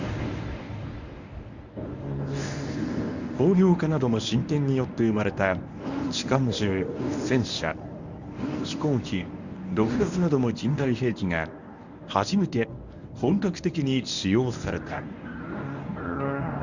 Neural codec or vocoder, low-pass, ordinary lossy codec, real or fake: codec, 24 kHz, 0.9 kbps, WavTokenizer, medium speech release version 1; 7.2 kHz; none; fake